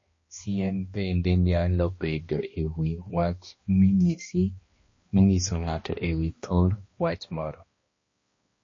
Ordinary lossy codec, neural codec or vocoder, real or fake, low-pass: MP3, 32 kbps; codec, 16 kHz, 1 kbps, X-Codec, HuBERT features, trained on balanced general audio; fake; 7.2 kHz